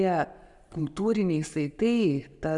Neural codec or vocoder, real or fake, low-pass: none; real; 10.8 kHz